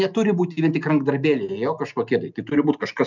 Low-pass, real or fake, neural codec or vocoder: 7.2 kHz; real; none